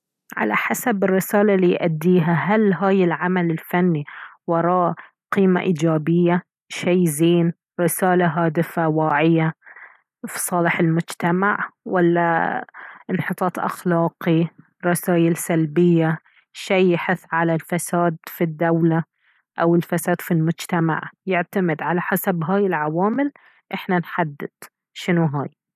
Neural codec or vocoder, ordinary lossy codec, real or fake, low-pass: none; none; real; 14.4 kHz